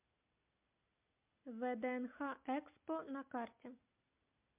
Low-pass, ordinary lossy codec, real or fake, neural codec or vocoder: 3.6 kHz; MP3, 32 kbps; real; none